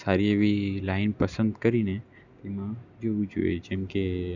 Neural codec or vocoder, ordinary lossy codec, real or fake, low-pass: none; none; real; 7.2 kHz